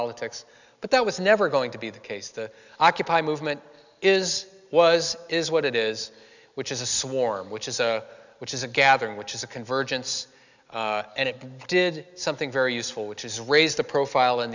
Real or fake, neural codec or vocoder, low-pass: real; none; 7.2 kHz